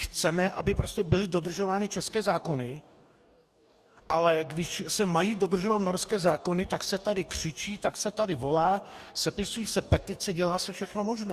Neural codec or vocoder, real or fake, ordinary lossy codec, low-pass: codec, 44.1 kHz, 2.6 kbps, DAC; fake; Opus, 64 kbps; 14.4 kHz